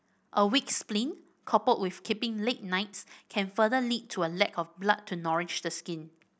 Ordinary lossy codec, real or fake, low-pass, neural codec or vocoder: none; real; none; none